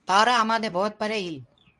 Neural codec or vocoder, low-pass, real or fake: codec, 24 kHz, 0.9 kbps, WavTokenizer, medium speech release version 2; 10.8 kHz; fake